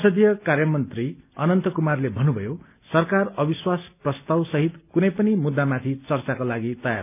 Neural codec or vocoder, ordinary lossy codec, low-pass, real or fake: none; none; 3.6 kHz; real